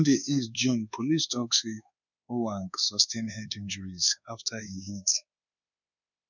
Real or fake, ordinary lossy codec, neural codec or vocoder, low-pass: fake; none; codec, 24 kHz, 1.2 kbps, DualCodec; 7.2 kHz